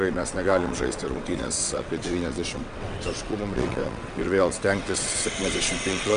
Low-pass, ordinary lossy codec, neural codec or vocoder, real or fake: 9.9 kHz; MP3, 64 kbps; vocoder, 22.05 kHz, 80 mel bands, WaveNeXt; fake